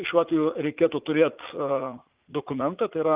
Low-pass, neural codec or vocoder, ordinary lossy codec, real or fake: 3.6 kHz; vocoder, 44.1 kHz, 80 mel bands, Vocos; Opus, 24 kbps; fake